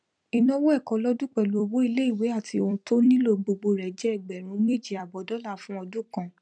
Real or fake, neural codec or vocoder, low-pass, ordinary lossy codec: fake; vocoder, 44.1 kHz, 128 mel bands every 256 samples, BigVGAN v2; 9.9 kHz; none